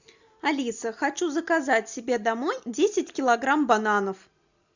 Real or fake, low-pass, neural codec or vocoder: real; 7.2 kHz; none